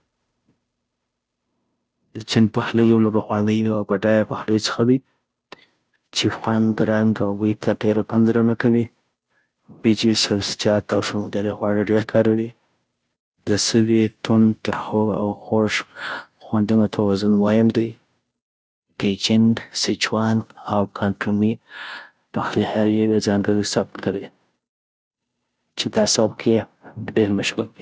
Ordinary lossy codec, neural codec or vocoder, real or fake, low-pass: none; codec, 16 kHz, 0.5 kbps, FunCodec, trained on Chinese and English, 25 frames a second; fake; none